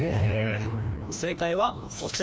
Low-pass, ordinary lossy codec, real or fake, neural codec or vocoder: none; none; fake; codec, 16 kHz, 1 kbps, FreqCodec, larger model